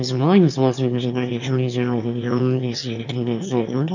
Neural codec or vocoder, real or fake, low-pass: autoencoder, 22.05 kHz, a latent of 192 numbers a frame, VITS, trained on one speaker; fake; 7.2 kHz